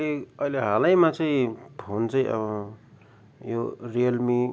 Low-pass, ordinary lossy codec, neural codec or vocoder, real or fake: none; none; none; real